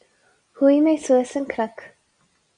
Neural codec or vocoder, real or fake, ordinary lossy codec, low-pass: none; real; Opus, 64 kbps; 9.9 kHz